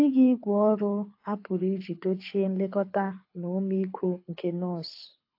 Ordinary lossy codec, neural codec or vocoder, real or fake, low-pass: AAC, 32 kbps; codec, 24 kHz, 6 kbps, HILCodec; fake; 5.4 kHz